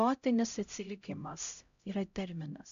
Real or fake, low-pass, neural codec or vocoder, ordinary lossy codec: fake; 7.2 kHz; codec, 16 kHz, 0.5 kbps, X-Codec, HuBERT features, trained on LibriSpeech; MP3, 64 kbps